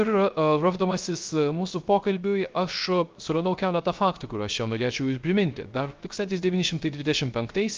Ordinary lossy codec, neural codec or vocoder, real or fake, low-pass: Opus, 24 kbps; codec, 16 kHz, 0.3 kbps, FocalCodec; fake; 7.2 kHz